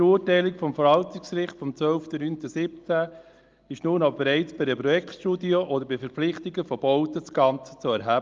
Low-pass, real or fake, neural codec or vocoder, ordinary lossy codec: 7.2 kHz; real; none; Opus, 32 kbps